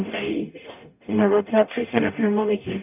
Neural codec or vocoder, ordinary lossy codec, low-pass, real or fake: codec, 44.1 kHz, 0.9 kbps, DAC; none; 3.6 kHz; fake